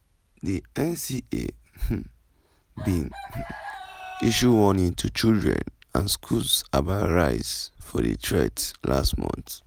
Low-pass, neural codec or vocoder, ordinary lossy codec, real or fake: none; none; none; real